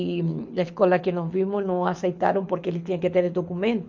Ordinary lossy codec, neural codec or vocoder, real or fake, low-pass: MP3, 48 kbps; codec, 24 kHz, 6 kbps, HILCodec; fake; 7.2 kHz